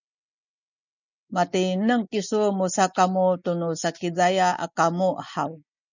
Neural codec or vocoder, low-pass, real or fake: none; 7.2 kHz; real